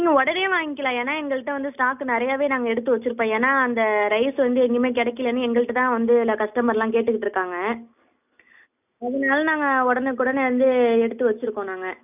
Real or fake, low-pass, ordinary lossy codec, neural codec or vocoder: real; 3.6 kHz; none; none